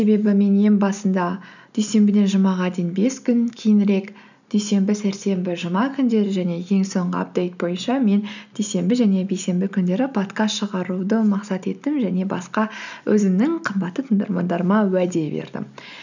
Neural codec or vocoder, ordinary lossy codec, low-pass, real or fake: none; none; 7.2 kHz; real